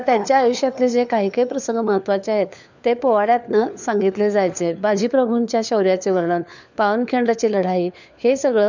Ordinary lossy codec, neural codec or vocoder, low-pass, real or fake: none; codec, 16 kHz, 4 kbps, FunCodec, trained on LibriTTS, 50 frames a second; 7.2 kHz; fake